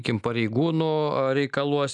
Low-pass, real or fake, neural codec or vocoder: 10.8 kHz; real; none